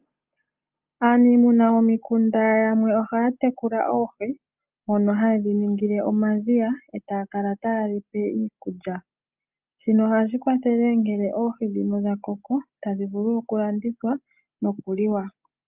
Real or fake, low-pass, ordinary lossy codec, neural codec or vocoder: real; 3.6 kHz; Opus, 24 kbps; none